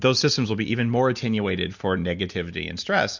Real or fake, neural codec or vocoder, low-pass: real; none; 7.2 kHz